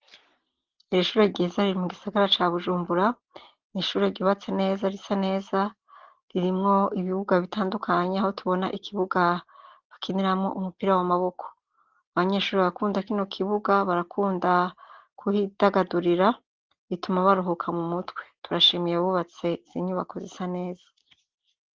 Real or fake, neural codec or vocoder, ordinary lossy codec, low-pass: real; none; Opus, 16 kbps; 7.2 kHz